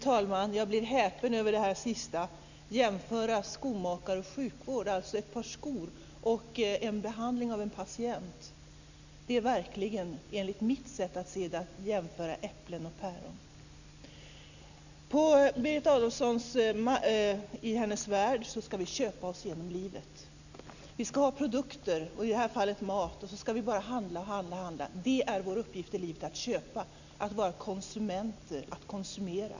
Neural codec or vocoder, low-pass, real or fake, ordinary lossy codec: none; 7.2 kHz; real; none